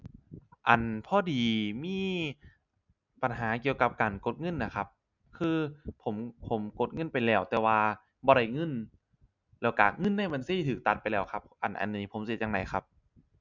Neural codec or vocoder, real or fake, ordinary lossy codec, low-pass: none; real; none; 7.2 kHz